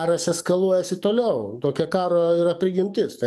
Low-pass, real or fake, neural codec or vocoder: 14.4 kHz; fake; codec, 44.1 kHz, 7.8 kbps, DAC